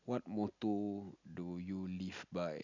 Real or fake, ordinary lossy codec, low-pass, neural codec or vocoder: real; none; 7.2 kHz; none